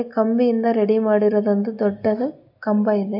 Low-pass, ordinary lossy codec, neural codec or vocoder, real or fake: 5.4 kHz; AAC, 48 kbps; none; real